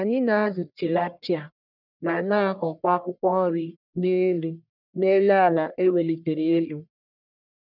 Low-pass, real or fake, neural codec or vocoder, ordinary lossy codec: 5.4 kHz; fake; codec, 44.1 kHz, 1.7 kbps, Pupu-Codec; none